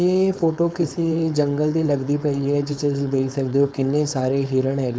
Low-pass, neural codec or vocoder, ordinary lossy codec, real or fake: none; codec, 16 kHz, 4.8 kbps, FACodec; none; fake